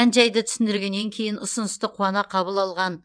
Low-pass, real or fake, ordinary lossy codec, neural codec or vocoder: 9.9 kHz; fake; none; vocoder, 22.05 kHz, 80 mel bands, WaveNeXt